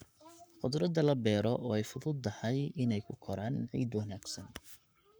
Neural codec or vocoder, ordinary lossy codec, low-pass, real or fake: codec, 44.1 kHz, 7.8 kbps, Pupu-Codec; none; none; fake